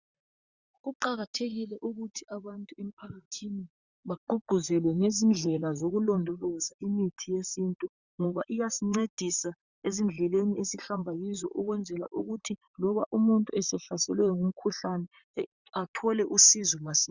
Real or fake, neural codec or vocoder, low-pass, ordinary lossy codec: fake; vocoder, 44.1 kHz, 128 mel bands, Pupu-Vocoder; 7.2 kHz; Opus, 64 kbps